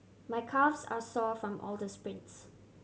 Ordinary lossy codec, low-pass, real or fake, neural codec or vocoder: none; none; real; none